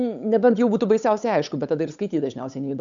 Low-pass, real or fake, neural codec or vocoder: 7.2 kHz; fake; codec, 16 kHz, 8 kbps, FunCodec, trained on Chinese and English, 25 frames a second